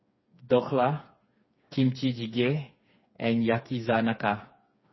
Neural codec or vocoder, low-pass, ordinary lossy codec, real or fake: codec, 16 kHz, 4 kbps, FreqCodec, smaller model; 7.2 kHz; MP3, 24 kbps; fake